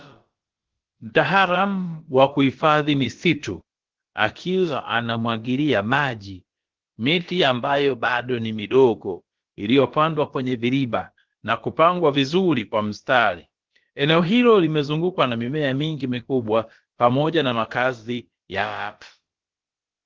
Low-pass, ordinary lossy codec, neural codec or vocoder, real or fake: 7.2 kHz; Opus, 16 kbps; codec, 16 kHz, about 1 kbps, DyCAST, with the encoder's durations; fake